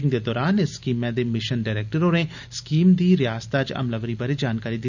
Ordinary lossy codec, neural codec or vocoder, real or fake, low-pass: none; none; real; 7.2 kHz